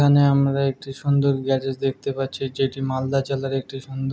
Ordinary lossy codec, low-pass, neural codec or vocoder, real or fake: none; none; none; real